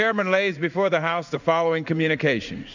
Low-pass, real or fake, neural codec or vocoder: 7.2 kHz; fake; codec, 16 kHz in and 24 kHz out, 1 kbps, XY-Tokenizer